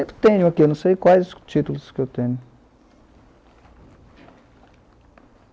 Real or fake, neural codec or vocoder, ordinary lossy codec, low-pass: real; none; none; none